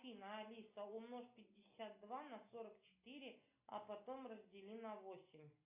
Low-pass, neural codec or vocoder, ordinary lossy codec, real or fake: 3.6 kHz; none; AAC, 32 kbps; real